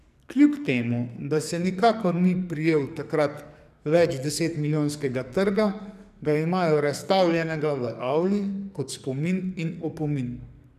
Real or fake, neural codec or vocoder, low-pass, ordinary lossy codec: fake; codec, 44.1 kHz, 2.6 kbps, SNAC; 14.4 kHz; none